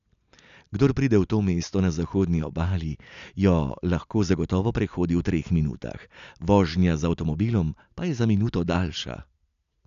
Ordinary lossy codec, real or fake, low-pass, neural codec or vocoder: none; real; 7.2 kHz; none